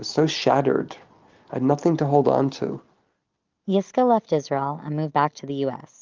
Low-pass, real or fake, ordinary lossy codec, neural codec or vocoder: 7.2 kHz; real; Opus, 24 kbps; none